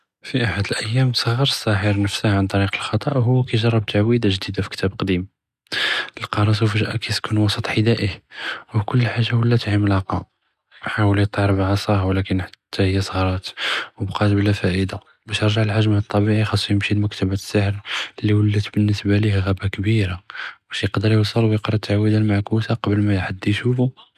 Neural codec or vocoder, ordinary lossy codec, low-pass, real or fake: none; none; 14.4 kHz; real